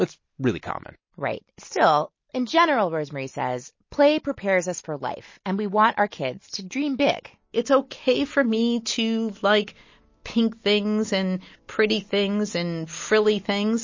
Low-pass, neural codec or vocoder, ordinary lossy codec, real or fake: 7.2 kHz; none; MP3, 32 kbps; real